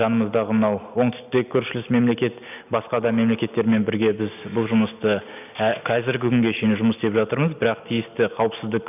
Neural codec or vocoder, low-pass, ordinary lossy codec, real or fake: none; 3.6 kHz; none; real